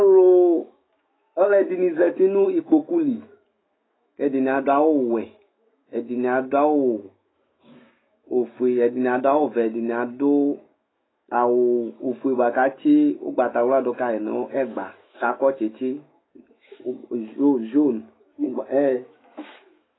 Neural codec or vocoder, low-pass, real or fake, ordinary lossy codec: autoencoder, 48 kHz, 128 numbers a frame, DAC-VAE, trained on Japanese speech; 7.2 kHz; fake; AAC, 16 kbps